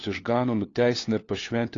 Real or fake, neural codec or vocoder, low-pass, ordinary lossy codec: fake; codec, 16 kHz, 4 kbps, FunCodec, trained on LibriTTS, 50 frames a second; 7.2 kHz; AAC, 32 kbps